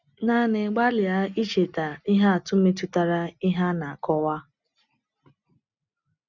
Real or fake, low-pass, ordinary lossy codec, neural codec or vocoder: real; 7.2 kHz; none; none